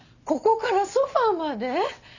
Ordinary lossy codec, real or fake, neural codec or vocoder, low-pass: none; real; none; 7.2 kHz